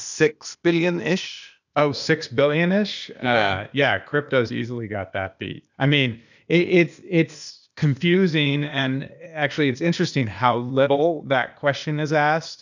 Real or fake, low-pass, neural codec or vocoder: fake; 7.2 kHz; codec, 16 kHz, 0.8 kbps, ZipCodec